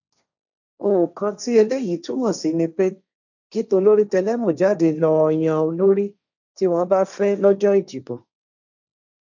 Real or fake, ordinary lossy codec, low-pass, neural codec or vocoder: fake; none; 7.2 kHz; codec, 16 kHz, 1.1 kbps, Voila-Tokenizer